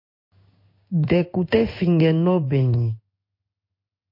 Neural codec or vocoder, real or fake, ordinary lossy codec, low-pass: codec, 16 kHz in and 24 kHz out, 1 kbps, XY-Tokenizer; fake; MP3, 32 kbps; 5.4 kHz